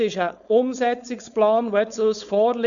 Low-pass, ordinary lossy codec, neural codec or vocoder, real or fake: 7.2 kHz; none; codec, 16 kHz, 4.8 kbps, FACodec; fake